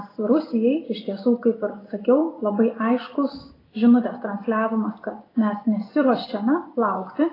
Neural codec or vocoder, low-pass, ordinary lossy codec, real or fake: none; 5.4 kHz; AAC, 24 kbps; real